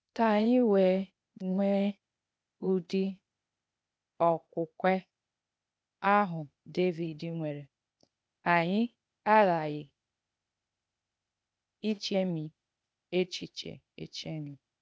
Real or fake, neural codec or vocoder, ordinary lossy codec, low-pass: fake; codec, 16 kHz, 0.8 kbps, ZipCodec; none; none